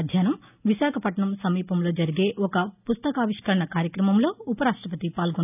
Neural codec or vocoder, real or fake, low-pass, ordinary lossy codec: none; real; 3.6 kHz; none